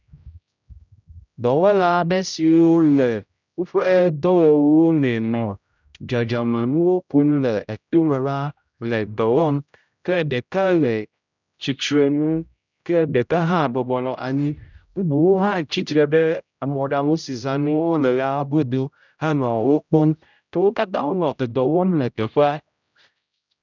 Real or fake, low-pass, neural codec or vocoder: fake; 7.2 kHz; codec, 16 kHz, 0.5 kbps, X-Codec, HuBERT features, trained on general audio